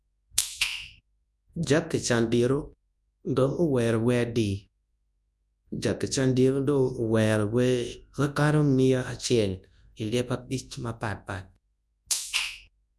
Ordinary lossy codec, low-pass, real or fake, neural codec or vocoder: none; none; fake; codec, 24 kHz, 0.9 kbps, WavTokenizer, large speech release